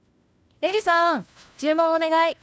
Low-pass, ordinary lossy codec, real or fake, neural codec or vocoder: none; none; fake; codec, 16 kHz, 1 kbps, FunCodec, trained on LibriTTS, 50 frames a second